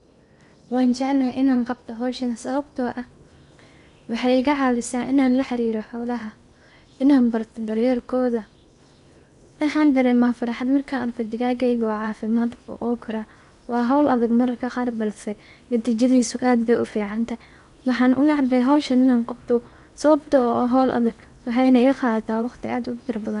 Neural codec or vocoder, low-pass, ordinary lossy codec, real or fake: codec, 16 kHz in and 24 kHz out, 0.8 kbps, FocalCodec, streaming, 65536 codes; 10.8 kHz; none; fake